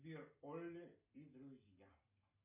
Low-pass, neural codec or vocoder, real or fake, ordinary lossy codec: 3.6 kHz; none; real; MP3, 24 kbps